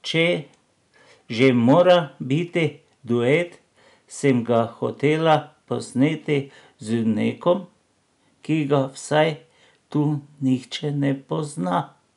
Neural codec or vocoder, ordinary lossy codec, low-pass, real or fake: none; none; 10.8 kHz; real